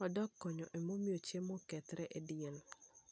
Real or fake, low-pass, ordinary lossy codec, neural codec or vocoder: real; none; none; none